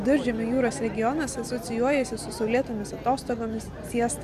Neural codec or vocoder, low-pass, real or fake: none; 14.4 kHz; real